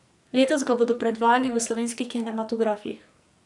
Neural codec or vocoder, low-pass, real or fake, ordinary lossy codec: codec, 44.1 kHz, 2.6 kbps, SNAC; 10.8 kHz; fake; none